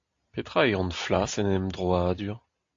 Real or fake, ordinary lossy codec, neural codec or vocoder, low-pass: real; AAC, 48 kbps; none; 7.2 kHz